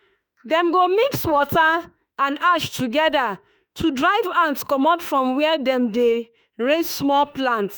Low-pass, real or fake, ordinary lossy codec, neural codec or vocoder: none; fake; none; autoencoder, 48 kHz, 32 numbers a frame, DAC-VAE, trained on Japanese speech